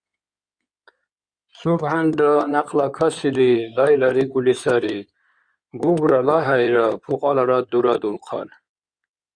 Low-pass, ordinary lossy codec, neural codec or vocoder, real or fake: 9.9 kHz; Opus, 64 kbps; codec, 16 kHz in and 24 kHz out, 2.2 kbps, FireRedTTS-2 codec; fake